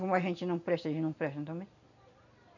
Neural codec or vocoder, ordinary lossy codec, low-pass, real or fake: none; none; 7.2 kHz; real